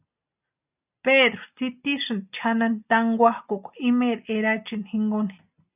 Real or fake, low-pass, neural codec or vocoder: real; 3.6 kHz; none